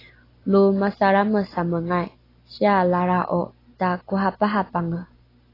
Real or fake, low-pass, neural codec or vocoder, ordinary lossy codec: real; 5.4 kHz; none; AAC, 24 kbps